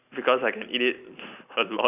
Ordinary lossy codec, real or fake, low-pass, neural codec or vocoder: none; real; 3.6 kHz; none